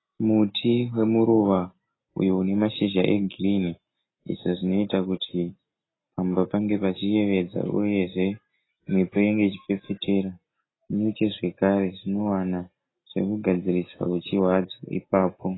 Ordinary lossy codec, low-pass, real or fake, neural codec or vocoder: AAC, 16 kbps; 7.2 kHz; real; none